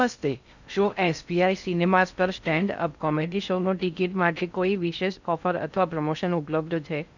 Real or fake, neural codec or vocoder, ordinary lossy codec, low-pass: fake; codec, 16 kHz in and 24 kHz out, 0.6 kbps, FocalCodec, streaming, 2048 codes; none; 7.2 kHz